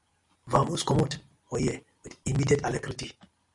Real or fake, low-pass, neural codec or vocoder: real; 10.8 kHz; none